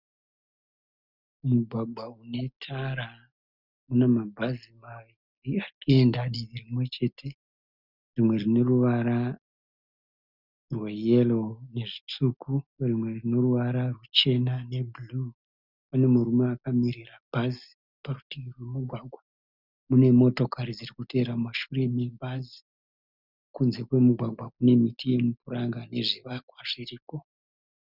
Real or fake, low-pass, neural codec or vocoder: real; 5.4 kHz; none